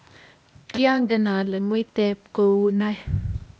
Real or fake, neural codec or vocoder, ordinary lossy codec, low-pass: fake; codec, 16 kHz, 0.8 kbps, ZipCodec; none; none